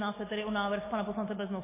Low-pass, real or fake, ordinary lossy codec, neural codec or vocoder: 3.6 kHz; real; MP3, 16 kbps; none